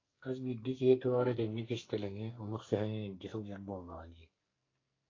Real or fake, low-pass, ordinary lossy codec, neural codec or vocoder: fake; 7.2 kHz; AAC, 32 kbps; codec, 44.1 kHz, 2.6 kbps, SNAC